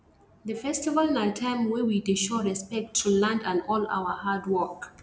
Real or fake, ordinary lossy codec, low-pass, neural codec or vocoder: real; none; none; none